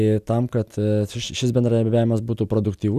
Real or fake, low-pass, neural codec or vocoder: real; 14.4 kHz; none